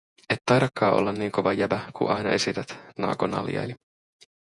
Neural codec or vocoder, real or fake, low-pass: vocoder, 48 kHz, 128 mel bands, Vocos; fake; 10.8 kHz